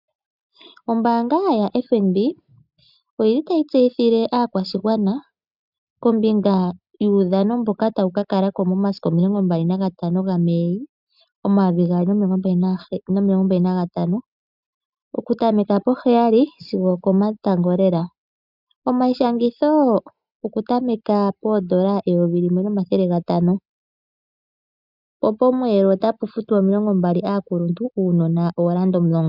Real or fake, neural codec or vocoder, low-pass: real; none; 5.4 kHz